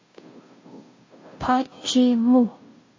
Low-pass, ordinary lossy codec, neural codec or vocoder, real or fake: 7.2 kHz; MP3, 32 kbps; codec, 16 kHz, 0.5 kbps, FunCodec, trained on Chinese and English, 25 frames a second; fake